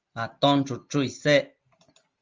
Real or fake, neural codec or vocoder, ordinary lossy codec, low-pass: real; none; Opus, 32 kbps; 7.2 kHz